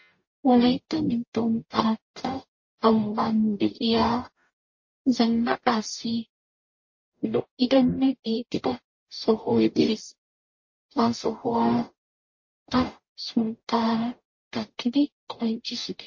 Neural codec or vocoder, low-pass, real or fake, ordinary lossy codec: codec, 44.1 kHz, 0.9 kbps, DAC; 7.2 kHz; fake; MP3, 32 kbps